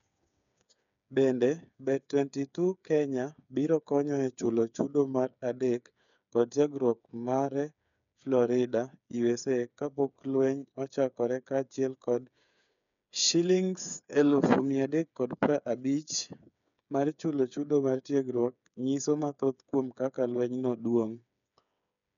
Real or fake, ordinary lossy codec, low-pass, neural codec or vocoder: fake; none; 7.2 kHz; codec, 16 kHz, 8 kbps, FreqCodec, smaller model